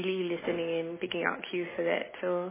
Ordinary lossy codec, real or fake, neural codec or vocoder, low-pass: MP3, 16 kbps; real; none; 3.6 kHz